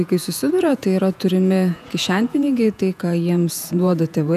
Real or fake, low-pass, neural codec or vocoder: fake; 14.4 kHz; vocoder, 48 kHz, 128 mel bands, Vocos